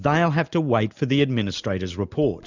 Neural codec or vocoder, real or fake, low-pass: none; real; 7.2 kHz